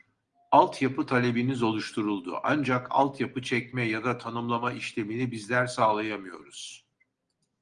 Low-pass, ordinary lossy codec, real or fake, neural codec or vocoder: 9.9 kHz; Opus, 24 kbps; real; none